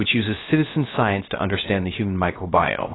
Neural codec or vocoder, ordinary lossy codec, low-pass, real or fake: codec, 16 kHz, 0.3 kbps, FocalCodec; AAC, 16 kbps; 7.2 kHz; fake